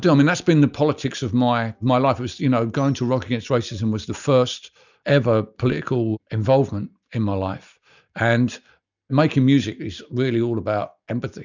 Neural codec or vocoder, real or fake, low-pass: none; real; 7.2 kHz